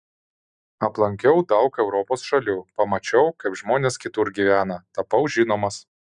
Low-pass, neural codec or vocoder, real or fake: 9.9 kHz; none; real